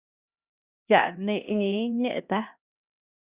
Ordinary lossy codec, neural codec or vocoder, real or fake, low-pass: Opus, 64 kbps; codec, 16 kHz, 1 kbps, X-Codec, HuBERT features, trained on LibriSpeech; fake; 3.6 kHz